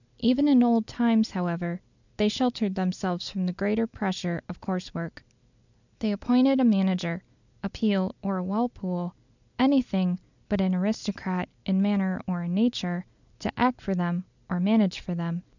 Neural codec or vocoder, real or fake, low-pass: none; real; 7.2 kHz